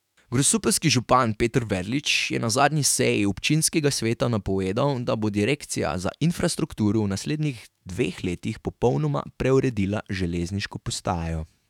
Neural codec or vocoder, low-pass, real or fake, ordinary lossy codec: autoencoder, 48 kHz, 128 numbers a frame, DAC-VAE, trained on Japanese speech; 19.8 kHz; fake; none